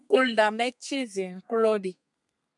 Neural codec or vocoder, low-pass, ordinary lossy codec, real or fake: codec, 32 kHz, 1.9 kbps, SNAC; 10.8 kHz; MP3, 96 kbps; fake